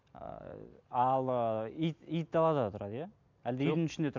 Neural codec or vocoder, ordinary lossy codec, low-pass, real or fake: none; MP3, 64 kbps; 7.2 kHz; real